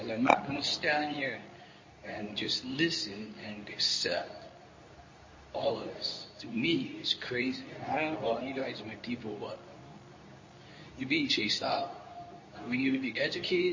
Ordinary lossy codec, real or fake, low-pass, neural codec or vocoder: MP3, 32 kbps; fake; 7.2 kHz; codec, 24 kHz, 0.9 kbps, WavTokenizer, medium speech release version 2